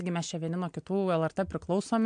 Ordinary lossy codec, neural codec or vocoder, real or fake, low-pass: MP3, 64 kbps; none; real; 9.9 kHz